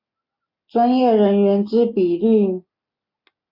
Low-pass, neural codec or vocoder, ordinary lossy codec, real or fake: 5.4 kHz; none; AAC, 48 kbps; real